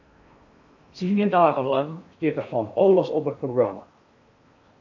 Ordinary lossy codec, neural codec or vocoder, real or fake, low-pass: MP3, 64 kbps; codec, 16 kHz in and 24 kHz out, 0.8 kbps, FocalCodec, streaming, 65536 codes; fake; 7.2 kHz